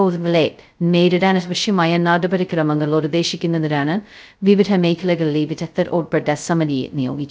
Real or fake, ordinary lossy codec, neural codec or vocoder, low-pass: fake; none; codec, 16 kHz, 0.2 kbps, FocalCodec; none